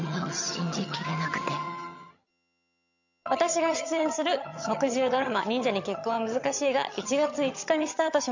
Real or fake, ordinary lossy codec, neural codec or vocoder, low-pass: fake; none; vocoder, 22.05 kHz, 80 mel bands, HiFi-GAN; 7.2 kHz